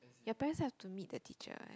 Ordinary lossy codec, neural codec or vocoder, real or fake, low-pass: none; none; real; none